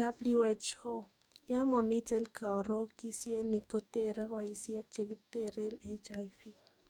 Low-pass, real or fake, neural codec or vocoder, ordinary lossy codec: 19.8 kHz; fake; codec, 44.1 kHz, 2.6 kbps, DAC; none